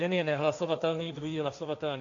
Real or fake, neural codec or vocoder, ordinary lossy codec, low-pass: fake; codec, 16 kHz, 1.1 kbps, Voila-Tokenizer; AAC, 64 kbps; 7.2 kHz